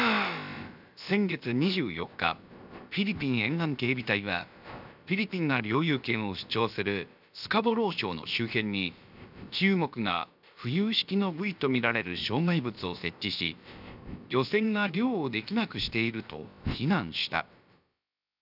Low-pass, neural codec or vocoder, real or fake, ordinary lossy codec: 5.4 kHz; codec, 16 kHz, about 1 kbps, DyCAST, with the encoder's durations; fake; none